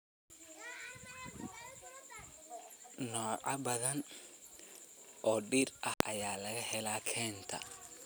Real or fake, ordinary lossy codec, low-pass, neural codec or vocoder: real; none; none; none